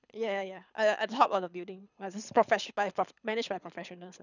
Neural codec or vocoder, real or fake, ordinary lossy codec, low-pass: codec, 24 kHz, 6 kbps, HILCodec; fake; none; 7.2 kHz